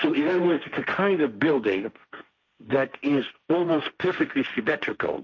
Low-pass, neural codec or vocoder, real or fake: 7.2 kHz; codec, 16 kHz, 1.1 kbps, Voila-Tokenizer; fake